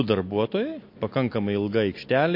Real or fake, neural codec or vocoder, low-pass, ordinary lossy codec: real; none; 5.4 kHz; MP3, 32 kbps